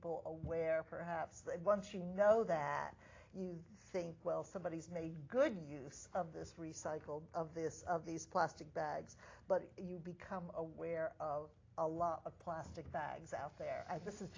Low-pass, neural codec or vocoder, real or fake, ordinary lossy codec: 7.2 kHz; none; real; AAC, 32 kbps